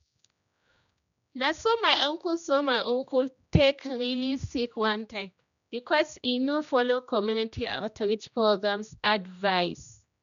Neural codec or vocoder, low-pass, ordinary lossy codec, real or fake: codec, 16 kHz, 1 kbps, X-Codec, HuBERT features, trained on general audio; 7.2 kHz; none; fake